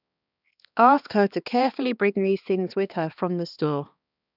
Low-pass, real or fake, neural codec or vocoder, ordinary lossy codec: 5.4 kHz; fake; codec, 16 kHz, 2 kbps, X-Codec, HuBERT features, trained on balanced general audio; none